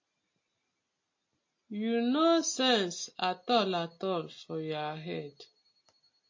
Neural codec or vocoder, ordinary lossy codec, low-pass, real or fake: none; MP3, 32 kbps; 7.2 kHz; real